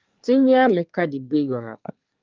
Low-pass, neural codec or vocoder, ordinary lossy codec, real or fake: 7.2 kHz; codec, 24 kHz, 1 kbps, SNAC; Opus, 24 kbps; fake